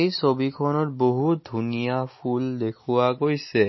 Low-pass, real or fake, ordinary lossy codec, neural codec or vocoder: 7.2 kHz; real; MP3, 24 kbps; none